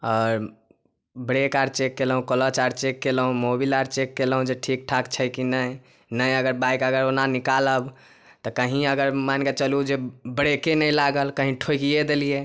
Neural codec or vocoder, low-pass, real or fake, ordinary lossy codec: none; none; real; none